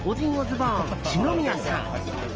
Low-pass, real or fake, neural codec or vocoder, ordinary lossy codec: 7.2 kHz; real; none; Opus, 24 kbps